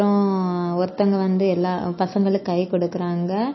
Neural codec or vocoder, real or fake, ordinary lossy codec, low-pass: none; real; MP3, 24 kbps; 7.2 kHz